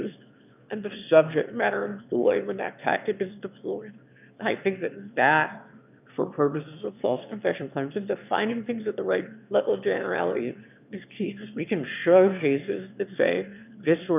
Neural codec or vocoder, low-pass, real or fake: autoencoder, 22.05 kHz, a latent of 192 numbers a frame, VITS, trained on one speaker; 3.6 kHz; fake